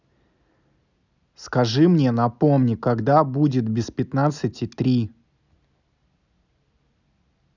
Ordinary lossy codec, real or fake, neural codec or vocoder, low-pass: none; real; none; 7.2 kHz